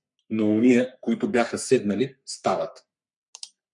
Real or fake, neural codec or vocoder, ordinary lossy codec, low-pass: fake; codec, 44.1 kHz, 3.4 kbps, Pupu-Codec; MP3, 96 kbps; 10.8 kHz